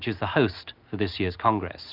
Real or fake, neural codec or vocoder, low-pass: real; none; 5.4 kHz